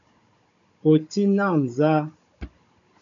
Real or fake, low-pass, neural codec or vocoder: fake; 7.2 kHz; codec, 16 kHz, 16 kbps, FunCodec, trained on Chinese and English, 50 frames a second